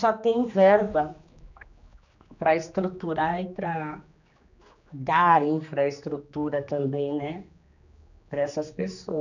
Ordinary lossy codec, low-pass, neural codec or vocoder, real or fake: none; 7.2 kHz; codec, 16 kHz, 2 kbps, X-Codec, HuBERT features, trained on general audio; fake